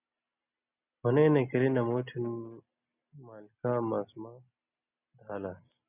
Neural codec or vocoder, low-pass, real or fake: none; 3.6 kHz; real